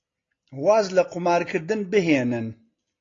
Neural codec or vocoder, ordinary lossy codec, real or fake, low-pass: none; AAC, 32 kbps; real; 7.2 kHz